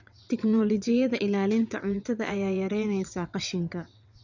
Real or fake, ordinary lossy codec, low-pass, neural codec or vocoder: fake; none; 7.2 kHz; vocoder, 22.05 kHz, 80 mel bands, WaveNeXt